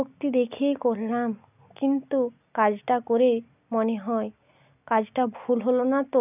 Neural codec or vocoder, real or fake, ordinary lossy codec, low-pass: none; real; none; 3.6 kHz